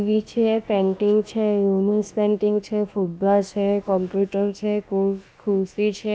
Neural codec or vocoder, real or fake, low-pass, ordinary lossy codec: codec, 16 kHz, about 1 kbps, DyCAST, with the encoder's durations; fake; none; none